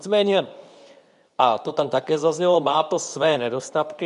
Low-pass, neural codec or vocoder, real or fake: 10.8 kHz; codec, 24 kHz, 0.9 kbps, WavTokenizer, medium speech release version 2; fake